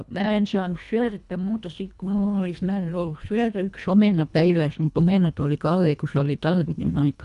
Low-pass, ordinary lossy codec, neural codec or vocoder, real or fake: 10.8 kHz; none; codec, 24 kHz, 1.5 kbps, HILCodec; fake